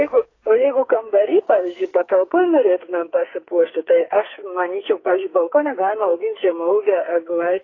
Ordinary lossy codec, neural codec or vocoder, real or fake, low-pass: AAC, 32 kbps; codec, 44.1 kHz, 2.6 kbps, SNAC; fake; 7.2 kHz